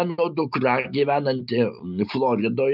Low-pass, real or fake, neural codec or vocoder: 5.4 kHz; real; none